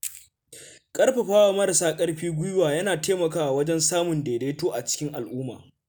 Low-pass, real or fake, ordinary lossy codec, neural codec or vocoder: none; real; none; none